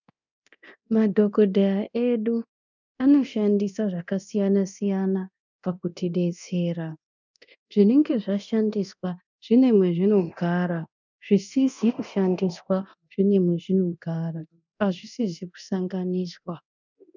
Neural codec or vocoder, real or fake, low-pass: codec, 24 kHz, 0.9 kbps, DualCodec; fake; 7.2 kHz